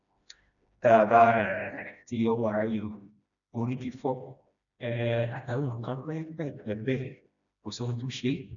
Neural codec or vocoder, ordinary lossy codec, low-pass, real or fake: codec, 16 kHz, 1 kbps, FreqCodec, smaller model; none; 7.2 kHz; fake